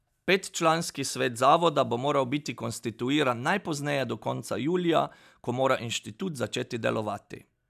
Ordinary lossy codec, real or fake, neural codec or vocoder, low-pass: none; real; none; 14.4 kHz